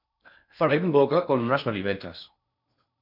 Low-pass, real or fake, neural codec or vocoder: 5.4 kHz; fake; codec, 16 kHz in and 24 kHz out, 0.6 kbps, FocalCodec, streaming, 4096 codes